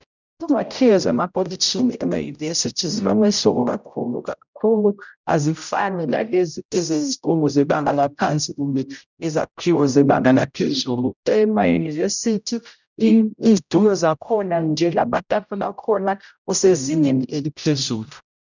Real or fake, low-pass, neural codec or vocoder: fake; 7.2 kHz; codec, 16 kHz, 0.5 kbps, X-Codec, HuBERT features, trained on general audio